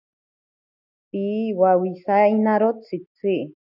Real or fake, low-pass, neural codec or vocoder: real; 5.4 kHz; none